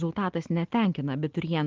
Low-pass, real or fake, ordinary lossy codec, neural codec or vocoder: 7.2 kHz; real; Opus, 16 kbps; none